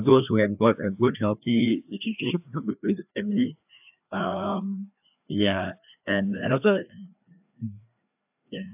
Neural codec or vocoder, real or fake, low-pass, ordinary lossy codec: codec, 16 kHz, 2 kbps, FreqCodec, larger model; fake; 3.6 kHz; AAC, 32 kbps